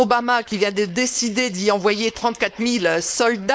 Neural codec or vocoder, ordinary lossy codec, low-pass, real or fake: codec, 16 kHz, 4.8 kbps, FACodec; none; none; fake